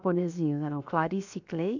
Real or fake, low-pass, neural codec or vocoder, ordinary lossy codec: fake; 7.2 kHz; codec, 16 kHz, 0.7 kbps, FocalCodec; none